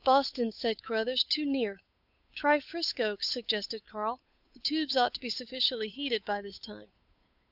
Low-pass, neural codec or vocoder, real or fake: 5.4 kHz; codec, 16 kHz, 8 kbps, FunCodec, trained on Chinese and English, 25 frames a second; fake